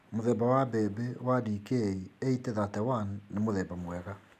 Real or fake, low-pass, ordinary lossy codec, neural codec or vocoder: real; 14.4 kHz; none; none